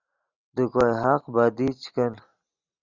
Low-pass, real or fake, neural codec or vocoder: 7.2 kHz; real; none